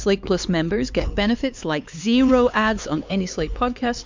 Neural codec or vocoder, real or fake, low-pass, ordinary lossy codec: codec, 16 kHz, 4 kbps, X-Codec, HuBERT features, trained on LibriSpeech; fake; 7.2 kHz; MP3, 64 kbps